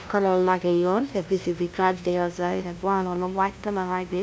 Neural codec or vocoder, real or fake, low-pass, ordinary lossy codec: codec, 16 kHz, 0.5 kbps, FunCodec, trained on LibriTTS, 25 frames a second; fake; none; none